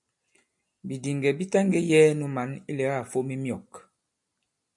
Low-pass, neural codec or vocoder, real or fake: 10.8 kHz; none; real